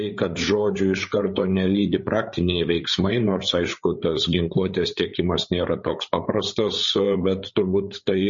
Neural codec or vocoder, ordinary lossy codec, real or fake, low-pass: codec, 16 kHz, 8 kbps, FreqCodec, larger model; MP3, 32 kbps; fake; 7.2 kHz